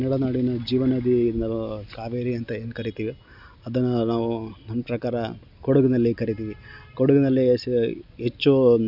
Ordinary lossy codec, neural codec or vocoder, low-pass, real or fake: none; none; 5.4 kHz; real